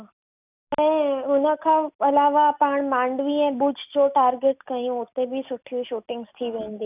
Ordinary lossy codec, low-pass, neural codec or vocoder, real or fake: none; 3.6 kHz; none; real